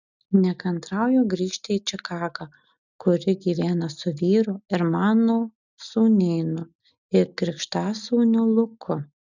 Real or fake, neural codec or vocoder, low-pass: real; none; 7.2 kHz